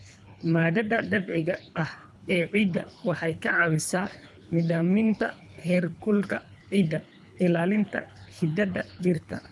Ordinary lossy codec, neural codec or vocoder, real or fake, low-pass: none; codec, 24 kHz, 3 kbps, HILCodec; fake; none